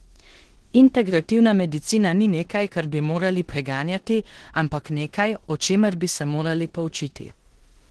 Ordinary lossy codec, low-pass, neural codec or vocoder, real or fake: Opus, 16 kbps; 10.8 kHz; codec, 16 kHz in and 24 kHz out, 0.9 kbps, LongCat-Audio-Codec, four codebook decoder; fake